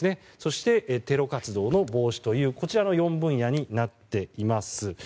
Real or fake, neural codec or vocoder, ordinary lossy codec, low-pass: real; none; none; none